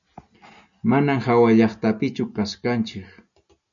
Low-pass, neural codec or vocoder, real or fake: 7.2 kHz; none; real